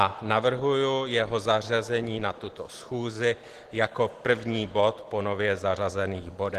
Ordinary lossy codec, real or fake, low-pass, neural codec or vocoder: Opus, 16 kbps; real; 14.4 kHz; none